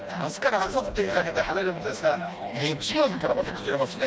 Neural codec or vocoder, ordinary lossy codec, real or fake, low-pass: codec, 16 kHz, 1 kbps, FreqCodec, smaller model; none; fake; none